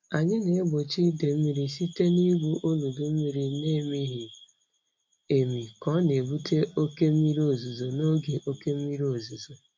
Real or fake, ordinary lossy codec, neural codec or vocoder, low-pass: real; MP3, 48 kbps; none; 7.2 kHz